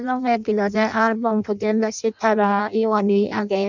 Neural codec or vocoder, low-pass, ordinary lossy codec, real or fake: codec, 16 kHz in and 24 kHz out, 0.6 kbps, FireRedTTS-2 codec; 7.2 kHz; none; fake